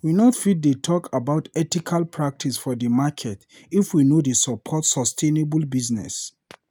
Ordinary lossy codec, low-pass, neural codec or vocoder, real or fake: none; none; none; real